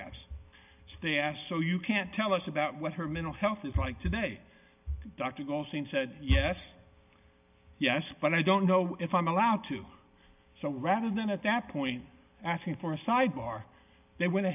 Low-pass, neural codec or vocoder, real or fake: 3.6 kHz; none; real